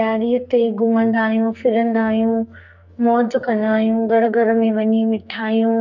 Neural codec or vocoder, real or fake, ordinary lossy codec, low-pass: codec, 44.1 kHz, 2.6 kbps, SNAC; fake; none; 7.2 kHz